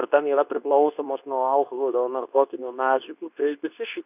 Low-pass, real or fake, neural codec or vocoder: 3.6 kHz; fake; codec, 24 kHz, 0.9 kbps, WavTokenizer, medium speech release version 2